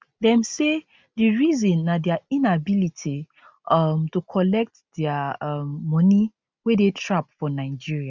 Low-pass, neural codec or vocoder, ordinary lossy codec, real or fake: none; none; none; real